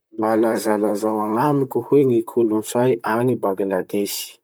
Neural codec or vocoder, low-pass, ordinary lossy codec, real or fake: vocoder, 44.1 kHz, 128 mel bands, Pupu-Vocoder; none; none; fake